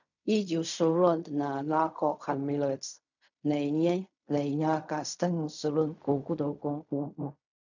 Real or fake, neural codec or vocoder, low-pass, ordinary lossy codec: fake; codec, 16 kHz in and 24 kHz out, 0.4 kbps, LongCat-Audio-Codec, fine tuned four codebook decoder; 7.2 kHz; none